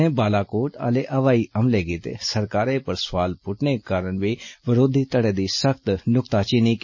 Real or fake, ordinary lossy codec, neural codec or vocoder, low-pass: real; MP3, 32 kbps; none; 7.2 kHz